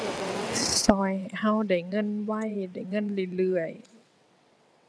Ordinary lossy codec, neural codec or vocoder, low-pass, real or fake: none; vocoder, 22.05 kHz, 80 mel bands, WaveNeXt; none; fake